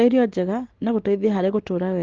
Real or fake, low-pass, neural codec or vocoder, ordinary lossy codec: real; 7.2 kHz; none; Opus, 16 kbps